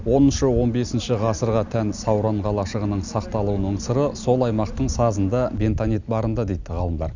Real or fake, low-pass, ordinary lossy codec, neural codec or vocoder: real; 7.2 kHz; none; none